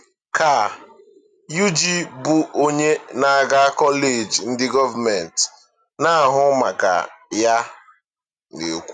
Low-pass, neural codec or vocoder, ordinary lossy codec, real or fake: 9.9 kHz; none; none; real